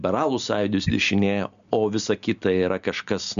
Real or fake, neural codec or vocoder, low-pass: real; none; 7.2 kHz